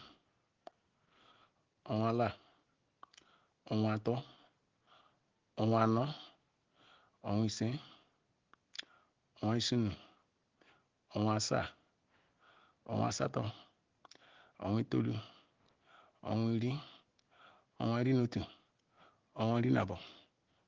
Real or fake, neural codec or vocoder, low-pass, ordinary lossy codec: real; none; 7.2 kHz; Opus, 16 kbps